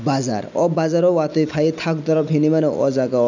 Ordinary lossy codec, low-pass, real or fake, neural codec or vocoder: none; 7.2 kHz; real; none